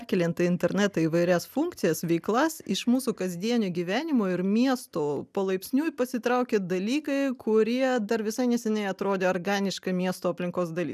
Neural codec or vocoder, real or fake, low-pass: none; real; 14.4 kHz